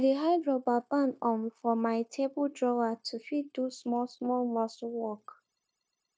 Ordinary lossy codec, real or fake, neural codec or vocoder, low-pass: none; fake; codec, 16 kHz, 0.9 kbps, LongCat-Audio-Codec; none